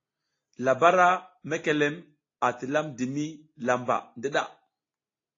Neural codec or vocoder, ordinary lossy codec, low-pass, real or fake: none; AAC, 32 kbps; 7.2 kHz; real